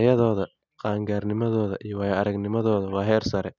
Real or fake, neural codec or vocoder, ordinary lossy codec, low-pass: real; none; none; 7.2 kHz